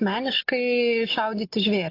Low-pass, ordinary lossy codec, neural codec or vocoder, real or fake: 5.4 kHz; AAC, 32 kbps; none; real